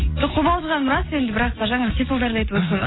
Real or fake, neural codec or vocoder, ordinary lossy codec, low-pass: real; none; AAC, 16 kbps; 7.2 kHz